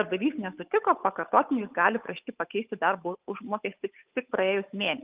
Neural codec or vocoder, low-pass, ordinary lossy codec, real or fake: codec, 16 kHz, 8 kbps, FunCodec, trained on Chinese and English, 25 frames a second; 3.6 kHz; Opus, 24 kbps; fake